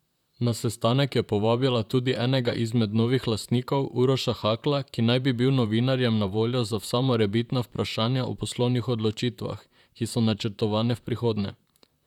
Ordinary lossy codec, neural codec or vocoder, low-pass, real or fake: none; vocoder, 44.1 kHz, 128 mel bands, Pupu-Vocoder; 19.8 kHz; fake